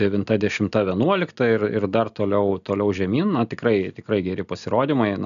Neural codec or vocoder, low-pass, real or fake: none; 7.2 kHz; real